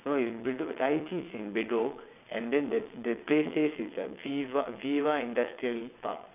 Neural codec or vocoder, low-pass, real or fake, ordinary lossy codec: vocoder, 22.05 kHz, 80 mel bands, WaveNeXt; 3.6 kHz; fake; none